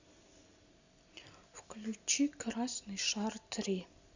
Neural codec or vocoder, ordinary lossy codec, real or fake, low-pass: none; Opus, 64 kbps; real; 7.2 kHz